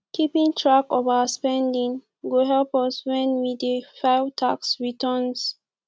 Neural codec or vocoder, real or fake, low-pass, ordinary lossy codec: none; real; none; none